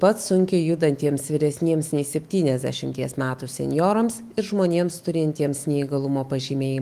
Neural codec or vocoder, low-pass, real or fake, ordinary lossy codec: autoencoder, 48 kHz, 128 numbers a frame, DAC-VAE, trained on Japanese speech; 14.4 kHz; fake; Opus, 24 kbps